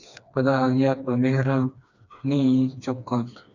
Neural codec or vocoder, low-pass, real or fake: codec, 16 kHz, 2 kbps, FreqCodec, smaller model; 7.2 kHz; fake